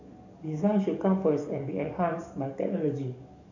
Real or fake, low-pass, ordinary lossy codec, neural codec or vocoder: fake; 7.2 kHz; none; codec, 44.1 kHz, 7.8 kbps, DAC